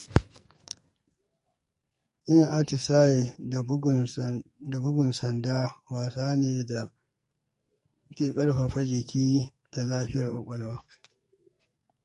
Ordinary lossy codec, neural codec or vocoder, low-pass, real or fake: MP3, 48 kbps; codec, 44.1 kHz, 2.6 kbps, SNAC; 14.4 kHz; fake